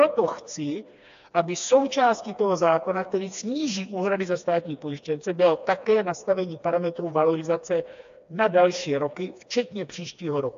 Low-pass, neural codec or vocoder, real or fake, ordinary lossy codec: 7.2 kHz; codec, 16 kHz, 2 kbps, FreqCodec, smaller model; fake; AAC, 64 kbps